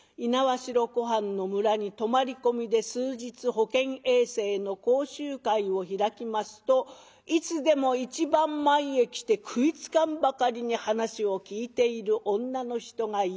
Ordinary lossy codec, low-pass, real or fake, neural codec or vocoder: none; none; real; none